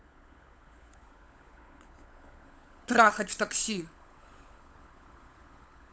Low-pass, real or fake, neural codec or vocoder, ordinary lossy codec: none; fake; codec, 16 kHz, 16 kbps, FunCodec, trained on LibriTTS, 50 frames a second; none